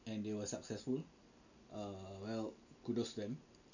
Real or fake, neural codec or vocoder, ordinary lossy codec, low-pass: real; none; none; 7.2 kHz